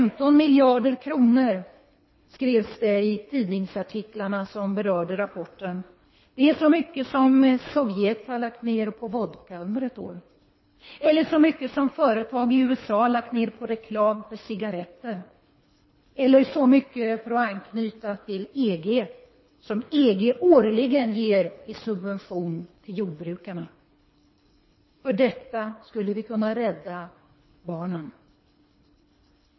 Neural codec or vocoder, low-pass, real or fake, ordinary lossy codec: codec, 24 kHz, 3 kbps, HILCodec; 7.2 kHz; fake; MP3, 24 kbps